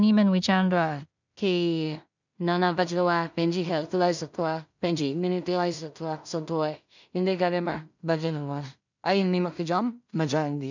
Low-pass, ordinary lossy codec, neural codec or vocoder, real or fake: 7.2 kHz; none; codec, 16 kHz in and 24 kHz out, 0.4 kbps, LongCat-Audio-Codec, two codebook decoder; fake